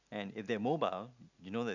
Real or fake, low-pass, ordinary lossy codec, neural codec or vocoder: real; 7.2 kHz; none; none